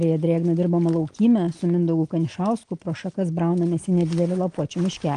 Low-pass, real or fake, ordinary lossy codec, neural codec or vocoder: 9.9 kHz; real; Opus, 24 kbps; none